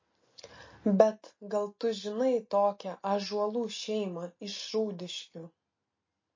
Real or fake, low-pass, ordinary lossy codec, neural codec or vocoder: real; 7.2 kHz; MP3, 32 kbps; none